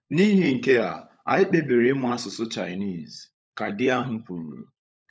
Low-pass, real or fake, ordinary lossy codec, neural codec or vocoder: none; fake; none; codec, 16 kHz, 16 kbps, FunCodec, trained on LibriTTS, 50 frames a second